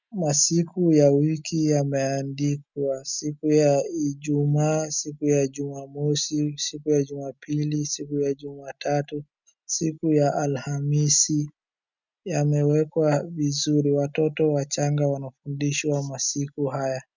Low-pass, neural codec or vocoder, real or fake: 7.2 kHz; none; real